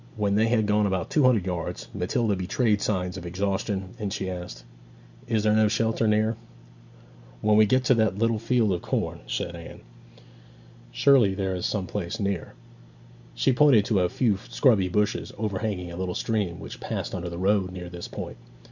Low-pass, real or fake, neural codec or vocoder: 7.2 kHz; real; none